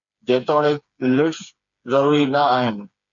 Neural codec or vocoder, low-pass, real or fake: codec, 16 kHz, 4 kbps, FreqCodec, smaller model; 7.2 kHz; fake